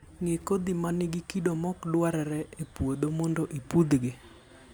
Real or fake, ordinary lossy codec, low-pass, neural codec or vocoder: real; none; none; none